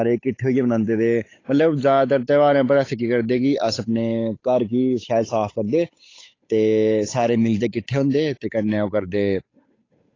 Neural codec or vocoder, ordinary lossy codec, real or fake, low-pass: codec, 16 kHz, 8 kbps, FunCodec, trained on Chinese and English, 25 frames a second; AAC, 32 kbps; fake; 7.2 kHz